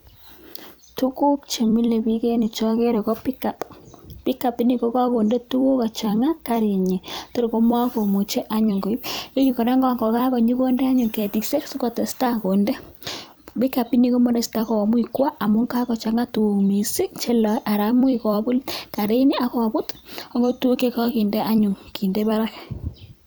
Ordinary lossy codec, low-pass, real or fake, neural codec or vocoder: none; none; fake; vocoder, 44.1 kHz, 128 mel bands every 256 samples, BigVGAN v2